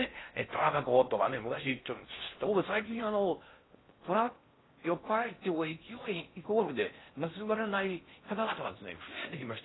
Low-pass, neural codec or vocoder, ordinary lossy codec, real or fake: 7.2 kHz; codec, 16 kHz in and 24 kHz out, 0.6 kbps, FocalCodec, streaming, 4096 codes; AAC, 16 kbps; fake